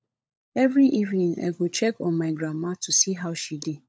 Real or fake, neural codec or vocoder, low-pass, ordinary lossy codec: fake; codec, 16 kHz, 16 kbps, FunCodec, trained on LibriTTS, 50 frames a second; none; none